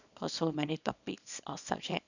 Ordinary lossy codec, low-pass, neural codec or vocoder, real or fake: none; 7.2 kHz; codec, 24 kHz, 0.9 kbps, WavTokenizer, small release; fake